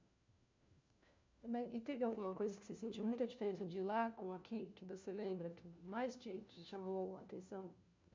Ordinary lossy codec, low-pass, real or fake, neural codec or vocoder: MP3, 64 kbps; 7.2 kHz; fake; codec, 16 kHz, 1 kbps, FunCodec, trained on LibriTTS, 50 frames a second